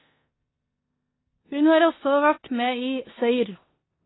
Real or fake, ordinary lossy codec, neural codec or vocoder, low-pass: fake; AAC, 16 kbps; codec, 16 kHz in and 24 kHz out, 0.9 kbps, LongCat-Audio-Codec, four codebook decoder; 7.2 kHz